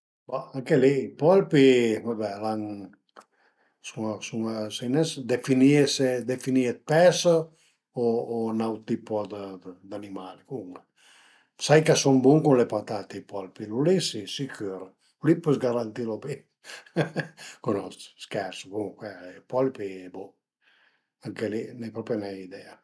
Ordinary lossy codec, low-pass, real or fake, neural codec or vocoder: none; none; real; none